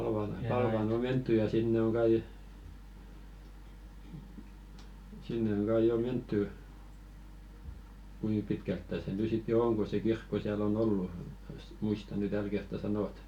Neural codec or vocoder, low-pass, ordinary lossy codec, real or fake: none; 19.8 kHz; none; real